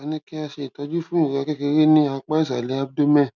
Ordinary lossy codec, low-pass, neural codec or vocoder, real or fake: none; 7.2 kHz; none; real